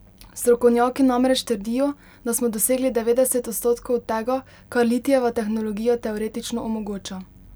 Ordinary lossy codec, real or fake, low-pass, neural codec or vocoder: none; real; none; none